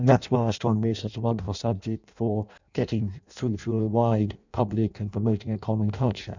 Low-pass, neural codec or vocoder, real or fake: 7.2 kHz; codec, 16 kHz in and 24 kHz out, 0.6 kbps, FireRedTTS-2 codec; fake